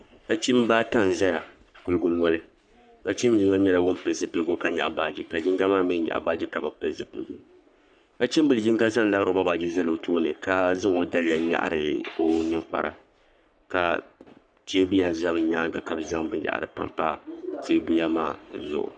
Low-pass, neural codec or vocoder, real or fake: 9.9 kHz; codec, 44.1 kHz, 3.4 kbps, Pupu-Codec; fake